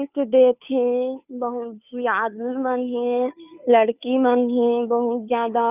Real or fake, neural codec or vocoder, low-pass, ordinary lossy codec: fake; codec, 16 kHz, 2 kbps, FunCodec, trained on Chinese and English, 25 frames a second; 3.6 kHz; none